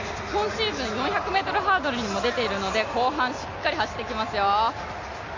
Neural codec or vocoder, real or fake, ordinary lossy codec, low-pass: none; real; AAC, 48 kbps; 7.2 kHz